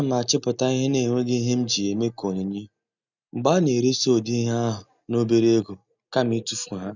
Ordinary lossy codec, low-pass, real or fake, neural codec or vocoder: none; 7.2 kHz; real; none